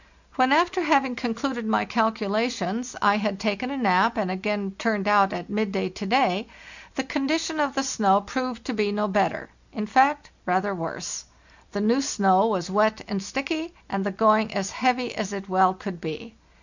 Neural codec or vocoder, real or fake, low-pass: none; real; 7.2 kHz